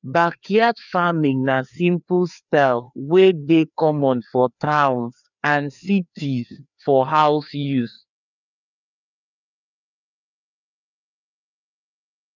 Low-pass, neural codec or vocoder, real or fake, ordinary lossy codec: 7.2 kHz; codec, 16 kHz, 2 kbps, FreqCodec, larger model; fake; none